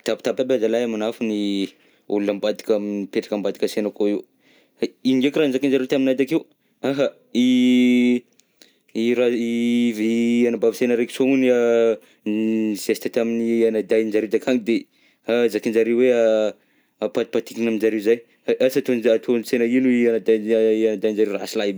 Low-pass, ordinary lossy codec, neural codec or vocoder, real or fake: none; none; none; real